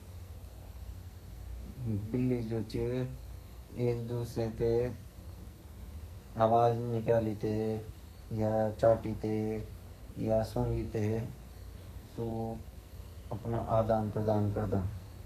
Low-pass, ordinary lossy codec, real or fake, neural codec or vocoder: 14.4 kHz; none; fake; codec, 44.1 kHz, 2.6 kbps, SNAC